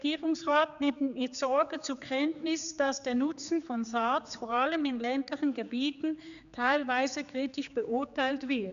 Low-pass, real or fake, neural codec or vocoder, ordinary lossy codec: 7.2 kHz; fake; codec, 16 kHz, 4 kbps, X-Codec, HuBERT features, trained on general audio; none